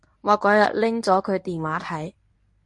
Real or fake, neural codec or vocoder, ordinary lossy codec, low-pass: fake; codec, 24 kHz, 0.9 kbps, WavTokenizer, medium speech release version 1; MP3, 48 kbps; 10.8 kHz